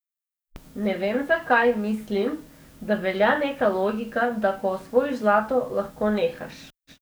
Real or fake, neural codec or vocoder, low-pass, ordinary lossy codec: fake; codec, 44.1 kHz, 7.8 kbps, DAC; none; none